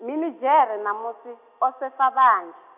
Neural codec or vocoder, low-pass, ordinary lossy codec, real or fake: none; 3.6 kHz; none; real